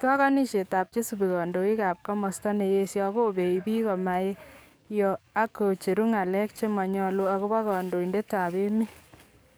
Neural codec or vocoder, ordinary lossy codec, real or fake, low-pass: codec, 44.1 kHz, 7.8 kbps, DAC; none; fake; none